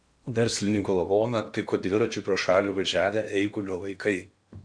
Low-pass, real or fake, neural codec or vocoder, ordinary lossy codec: 9.9 kHz; fake; codec, 16 kHz in and 24 kHz out, 0.8 kbps, FocalCodec, streaming, 65536 codes; MP3, 64 kbps